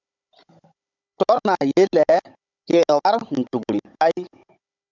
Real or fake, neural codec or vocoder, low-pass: fake; codec, 16 kHz, 16 kbps, FunCodec, trained on Chinese and English, 50 frames a second; 7.2 kHz